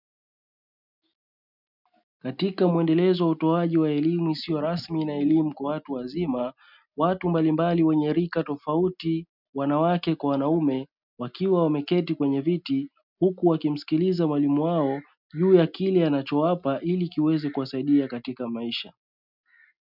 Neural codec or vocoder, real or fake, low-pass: none; real; 5.4 kHz